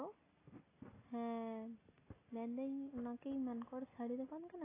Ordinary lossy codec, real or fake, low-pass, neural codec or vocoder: AAC, 16 kbps; real; 3.6 kHz; none